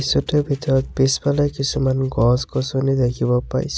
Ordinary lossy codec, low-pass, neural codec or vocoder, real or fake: none; none; none; real